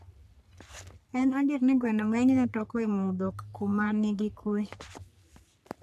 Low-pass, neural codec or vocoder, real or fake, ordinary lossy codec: 14.4 kHz; codec, 44.1 kHz, 3.4 kbps, Pupu-Codec; fake; none